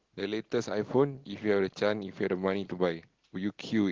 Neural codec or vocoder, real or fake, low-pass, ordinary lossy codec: none; real; 7.2 kHz; Opus, 16 kbps